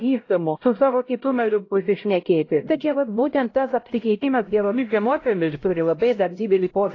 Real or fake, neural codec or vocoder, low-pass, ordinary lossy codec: fake; codec, 16 kHz, 0.5 kbps, X-Codec, HuBERT features, trained on LibriSpeech; 7.2 kHz; AAC, 32 kbps